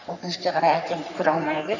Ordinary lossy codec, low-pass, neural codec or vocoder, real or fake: none; 7.2 kHz; codec, 44.1 kHz, 3.4 kbps, Pupu-Codec; fake